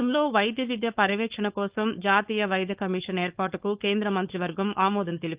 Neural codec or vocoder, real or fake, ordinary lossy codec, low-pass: codec, 16 kHz, 4.8 kbps, FACodec; fake; Opus, 32 kbps; 3.6 kHz